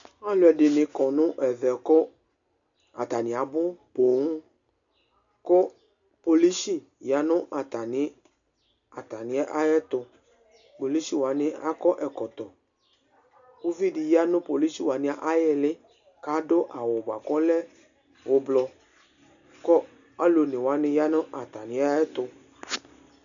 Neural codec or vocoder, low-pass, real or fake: none; 7.2 kHz; real